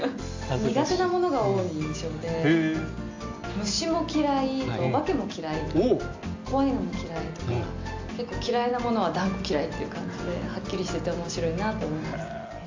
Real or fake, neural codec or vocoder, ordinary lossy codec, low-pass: real; none; AAC, 48 kbps; 7.2 kHz